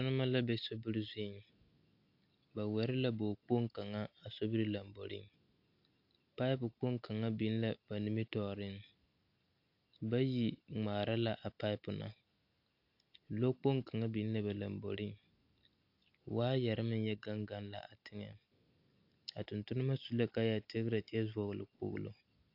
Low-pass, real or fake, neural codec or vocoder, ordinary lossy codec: 5.4 kHz; real; none; AAC, 48 kbps